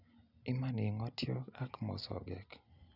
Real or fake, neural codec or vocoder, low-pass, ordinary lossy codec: real; none; 5.4 kHz; none